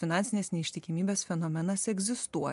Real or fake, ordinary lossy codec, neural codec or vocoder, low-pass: real; MP3, 64 kbps; none; 10.8 kHz